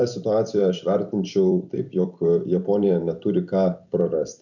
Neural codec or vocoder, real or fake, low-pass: vocoder, 44.1 kHz, 128 mel bands every 256 samples, BigVGAN v2; fake; 7.2 kHz